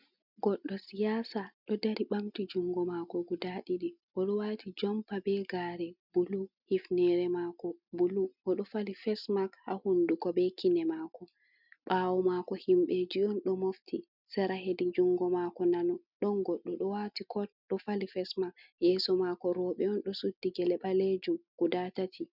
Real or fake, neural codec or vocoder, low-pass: real; none; 5.4 kHz